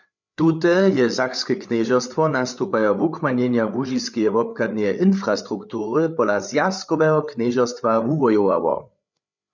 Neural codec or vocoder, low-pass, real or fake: codec, 16 kHz, 8 kbps, FreqCodec, larger model; 7.2 kHz; fake